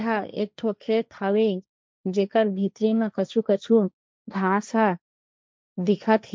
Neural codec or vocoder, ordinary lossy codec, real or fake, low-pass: codec, 16 kHz, 1.1 kbps, Voila-Tokenizer; none; fake; none